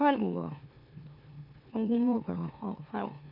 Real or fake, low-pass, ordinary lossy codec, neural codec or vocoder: fake; 5.4 kHz; none; autoencoder, 44.1 kHz, a latent of 192 numbers a frame, MeloTTS